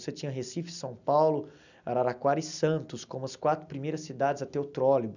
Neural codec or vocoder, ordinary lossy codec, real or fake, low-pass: none; none; real; 7.2 kHz